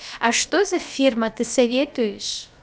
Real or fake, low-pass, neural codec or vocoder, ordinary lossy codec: fake; none; codec, 16 kHz, about 1 kbps, DyCAST, with the encoder's durations; none